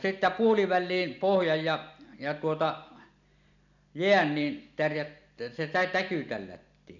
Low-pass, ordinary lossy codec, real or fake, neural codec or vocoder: 7.2 kHz; none; real; none